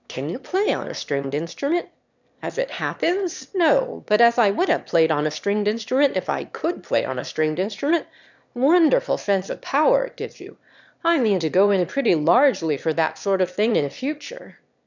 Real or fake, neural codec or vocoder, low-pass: fake; autoencoder, 22.05 kHz, a latent of 192 numbers a frame, VITS, trained on one speaker; 7.2 kHz